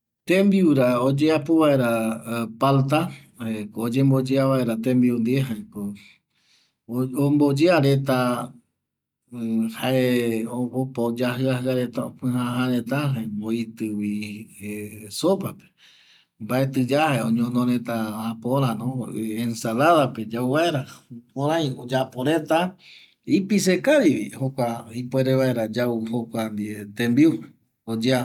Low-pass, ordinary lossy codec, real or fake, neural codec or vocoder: 19.8 kHz; none; real; none